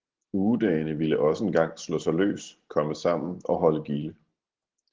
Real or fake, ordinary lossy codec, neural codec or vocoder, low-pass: real; Opus, 16 kbps; none; 7.2 kHz